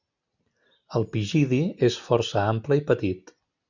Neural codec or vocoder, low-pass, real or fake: none; 7.2 kHz; real